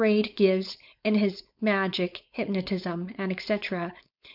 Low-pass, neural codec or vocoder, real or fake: 5.4 kHz; codec, 16 kHz, 4.8 kbps, FACodec; fake